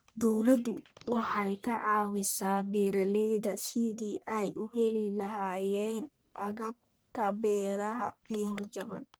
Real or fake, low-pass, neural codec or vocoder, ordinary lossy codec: fake; none; codec, 44.1 kHz, 1.7 kbps, Pupu-Codec; none